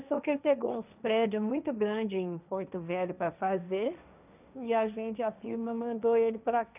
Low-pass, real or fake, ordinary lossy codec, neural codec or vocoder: 3.6 kHz; fake; none; codec, 16 kHz, 1.1 kbps, Voila-Tokenizer